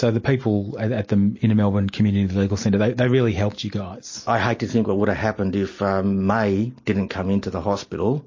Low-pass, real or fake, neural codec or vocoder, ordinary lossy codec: 7.2 kHz; real; none; MP3, 32 kbps